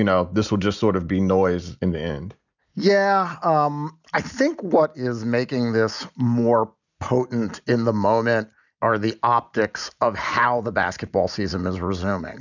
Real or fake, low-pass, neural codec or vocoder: real; 7.2 kHz; none